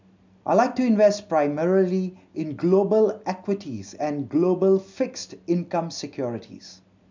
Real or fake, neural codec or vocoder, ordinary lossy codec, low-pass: real; none; MP3, 64 kbps; 7.2 kHz